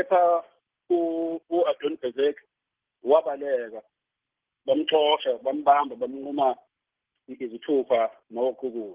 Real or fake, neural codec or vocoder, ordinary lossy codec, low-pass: real; none; Opus, 16 kbps; 3.6 kHz